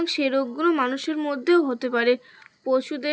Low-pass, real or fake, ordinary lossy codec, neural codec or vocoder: none; real; none; none